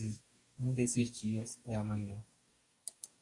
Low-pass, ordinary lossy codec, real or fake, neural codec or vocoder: 10.8 kHz; MP3, 48 kbps; fake; codec, 44.1 kHz, 2.6 kbps, DAC